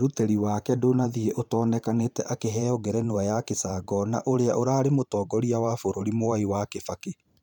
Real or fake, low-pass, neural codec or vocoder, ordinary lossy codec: fake; 19.8 kHz; vocoder, 44.1 kHz, 128 mel bands every 512 samples, BigVGAN v2; none